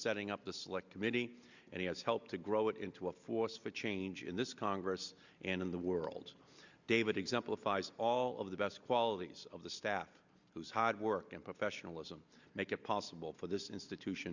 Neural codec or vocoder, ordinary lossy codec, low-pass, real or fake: none; Opus, 64 kbps; 7.2 kHz; real